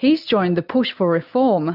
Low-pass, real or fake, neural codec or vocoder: 5.4 kHz; real; none